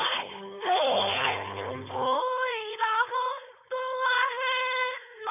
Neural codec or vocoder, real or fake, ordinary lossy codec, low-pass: codec, 16 kHz, 4.8 kbps, FACodec; fake; none; 3.6 kHz